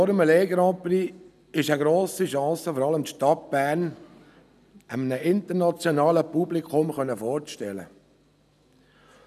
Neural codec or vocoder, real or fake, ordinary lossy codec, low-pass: none; real; none; 14.4 kHz